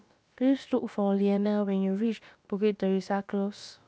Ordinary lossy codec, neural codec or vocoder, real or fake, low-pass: none; codec, 16 kHz, about 1 kbps, DyCAST, with the encoder's durations; fake; none